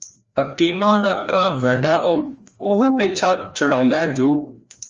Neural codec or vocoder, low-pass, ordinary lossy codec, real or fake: codec, 16 kHz, 1 kbps, FreqCodec, larger model; 7.2 kHz; Opus, 32 kbps; fake